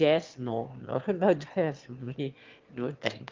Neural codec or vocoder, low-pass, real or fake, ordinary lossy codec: autoencoder, 22.05 kHz, a latent of 192 numbers a frame, VITS, trained on one speaker; 7.2 kHz; fake; Opus, 32 kbps